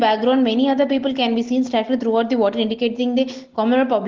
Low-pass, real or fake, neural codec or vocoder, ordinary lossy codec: 7.2 kHz; real; none; Opus, 16 kbps